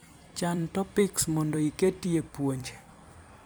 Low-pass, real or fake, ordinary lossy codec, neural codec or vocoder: none; fake; none; vocoder, 44.1 kHz, 128 mel bands every 256 samples, BigVGAN v2